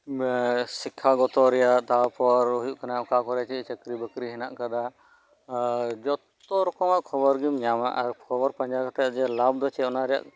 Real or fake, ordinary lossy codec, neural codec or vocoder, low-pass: real; none; none; none